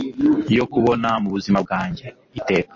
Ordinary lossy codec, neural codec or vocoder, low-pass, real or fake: MP3, 32 kbps; none; 7.2 kHz; real